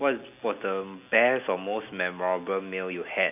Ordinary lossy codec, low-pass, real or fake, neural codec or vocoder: none; 3.6 kHz; real; none